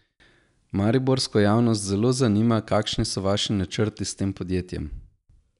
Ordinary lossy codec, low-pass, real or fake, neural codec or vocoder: none; 10.8 kHz; real; none